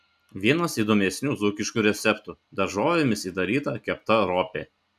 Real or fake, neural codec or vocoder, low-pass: real; none; 14.4 kHz